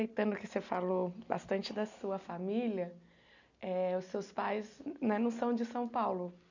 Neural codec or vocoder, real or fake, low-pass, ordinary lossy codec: none; real; 7.2 kHz; none